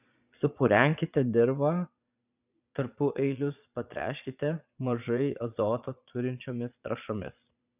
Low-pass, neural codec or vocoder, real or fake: 3.6 kHz; vocoder, 22.05 kHz, 80 mel bands, Vocos; fake